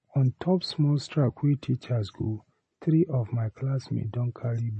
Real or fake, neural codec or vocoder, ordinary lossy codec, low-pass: real; none; MP3, 32 kbps; 9.9 kHz